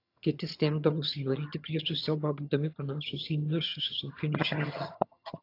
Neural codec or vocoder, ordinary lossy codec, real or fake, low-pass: vocoder, 22.05 kHz, 80 mel bands, HiFi-GAN; AAC, 32 kbps; fake; 5.4 kHz